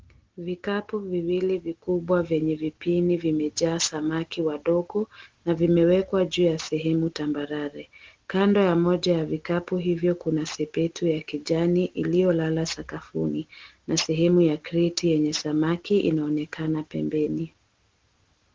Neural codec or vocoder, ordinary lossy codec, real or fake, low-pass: none; Opus, 16 kbps; real; 7.2 kHz